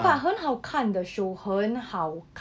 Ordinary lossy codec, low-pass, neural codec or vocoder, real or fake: none; none; none; real